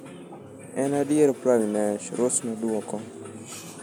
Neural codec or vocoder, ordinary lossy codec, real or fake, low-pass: none; none; real; 19.8 kHz